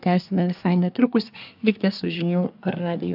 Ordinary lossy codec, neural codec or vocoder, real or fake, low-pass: AAC, 48 kbps; codec, 24 kHz, 1 kbps, SNAC; fake; 5.4 kHz